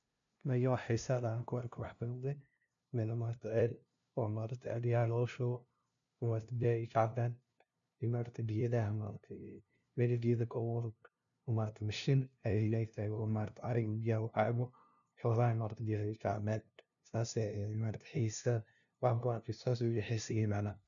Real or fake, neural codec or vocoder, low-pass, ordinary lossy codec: fake; codec, 16 kHz, 0.5 kbps, FunCodec, trained on LibriTTS, 25 frames a second; 7.2 kHz; none